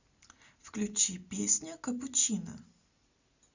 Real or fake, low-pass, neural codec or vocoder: real; 7.2 kHz; none